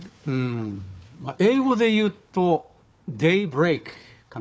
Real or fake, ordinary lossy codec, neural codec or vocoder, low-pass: fake; none; codec, 16 kHz, 4 kbps, FunCodec, trained on Chinese and English, 50 frames a second; none